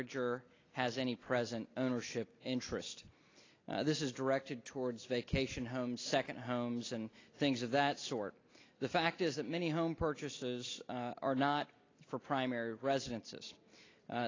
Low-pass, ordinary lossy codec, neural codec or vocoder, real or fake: 7.2 kHz; AAC, 32 kbps; none; real